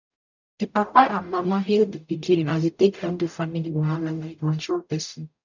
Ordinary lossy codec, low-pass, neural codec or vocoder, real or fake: none; 7.2 kHz; codec, 44.1 kHz, 0.9 kbps, DAC; fake